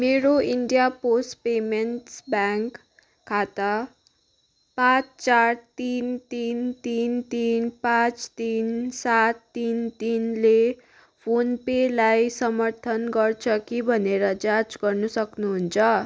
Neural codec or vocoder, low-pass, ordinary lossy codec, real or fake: none; none; none; real